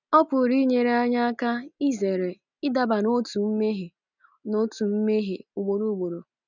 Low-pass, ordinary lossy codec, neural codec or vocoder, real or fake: 7.2 kHz; none; none; real